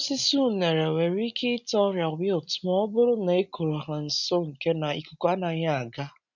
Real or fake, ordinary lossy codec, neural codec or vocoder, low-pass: real; none; none; 7.2 kHz